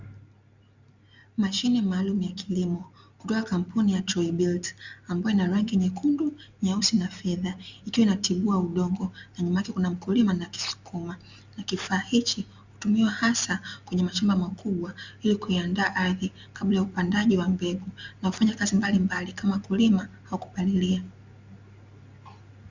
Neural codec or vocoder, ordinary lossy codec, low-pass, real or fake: none; Opus, 64 kbps; 7.2 kHz; real